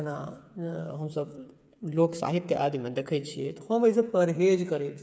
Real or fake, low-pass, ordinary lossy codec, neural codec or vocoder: fake; none; none; codec, 16 kHz, 8 kbps, FreqCodec, smaller model